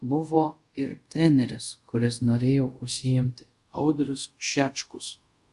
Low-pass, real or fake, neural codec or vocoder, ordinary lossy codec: 10.8 kHz; fake; codec, 24 kHz, 0.5 kbps, DualCodec; Opus, 64 kbps